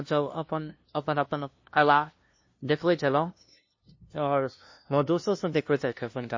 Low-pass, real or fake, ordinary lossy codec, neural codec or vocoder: 7.2 kHz; fake; MP3, 32 kbps; codec, 16 kHz, 0.5 kbps, FunCodec, trained on LibriTTS, 25 frames a second